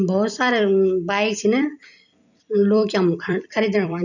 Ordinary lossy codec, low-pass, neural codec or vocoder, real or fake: none; 7.2 kHz; vocoder, 44.1 kHz, 128 mel bands every 256 samples, BigVGAN v2; fake